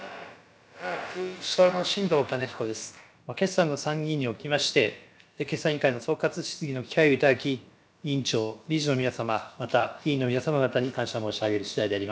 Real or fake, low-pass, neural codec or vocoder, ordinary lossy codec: fake; none; codec, 16 kHz, about 1 kbps, DyCAST, with the encoder's durations; none